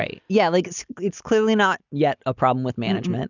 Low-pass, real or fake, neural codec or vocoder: 7.2 kHz; real; none